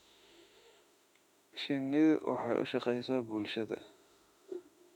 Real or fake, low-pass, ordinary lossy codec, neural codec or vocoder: fake; 19.8 kHz; none; autoencoder, 48 kHz, 32 numbers a frame, DAC-VAE, trained on Japanese speech